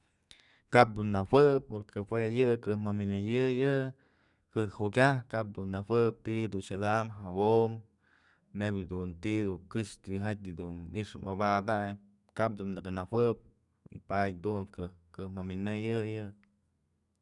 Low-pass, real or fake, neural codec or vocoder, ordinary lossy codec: 10.8 kHz; fake; codec, 32 kHz, 1.9 kbps, SNAC; none